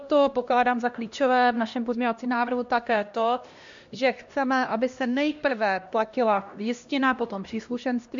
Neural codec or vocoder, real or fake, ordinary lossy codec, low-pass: codec, 16 kHz, 1 kbps, X-Codec, HuBERT features, trained on LibriSpeech; fake; MP3, 48 kbps; 7.2 kHz